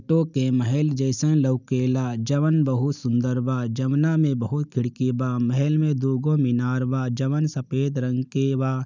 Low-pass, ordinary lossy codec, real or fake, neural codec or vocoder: 7.2 kHz; none; real; none